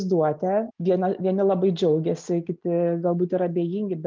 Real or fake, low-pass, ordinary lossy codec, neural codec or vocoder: real; 7.2 kHz; Opus, 24 kbps; none